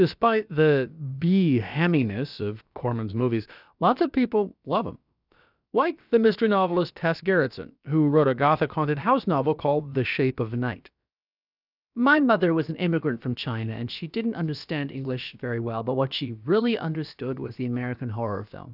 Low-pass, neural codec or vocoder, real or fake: 5.4 kHz; codec, 16 kHz, about 1 kbps, DyCAST, with the encoder's durations; fake